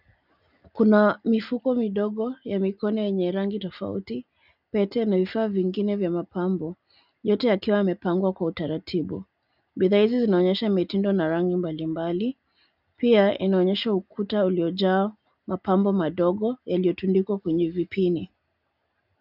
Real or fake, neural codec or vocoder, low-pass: real; none; 5.4 kHz